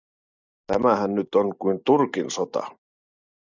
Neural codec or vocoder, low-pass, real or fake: none; 7.2 kHz; real